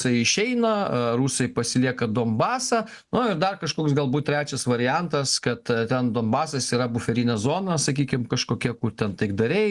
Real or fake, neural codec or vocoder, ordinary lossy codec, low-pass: real; none; Opus, 64 kbps; 10.8 kHz